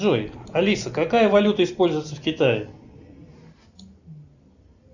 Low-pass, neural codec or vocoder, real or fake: 7.2 kHz; none; real